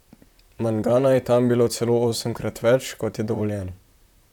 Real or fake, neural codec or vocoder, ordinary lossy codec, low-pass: fake; vocoder, 44.1 kHz, 128 mel bands, Pupu-Vocoder; none; 19.8 kHz